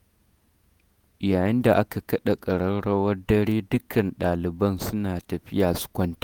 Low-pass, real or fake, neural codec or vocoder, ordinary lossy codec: none; fake; vocoder, 48 kHz, 128 mel bands, Vocos; none